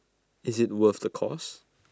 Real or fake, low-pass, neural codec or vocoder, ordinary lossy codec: real; none; none; none